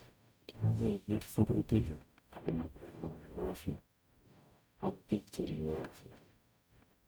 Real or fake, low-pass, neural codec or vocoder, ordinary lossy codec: fake; none; codec, 44.1 kHz, 0.9 kbps, DAC; none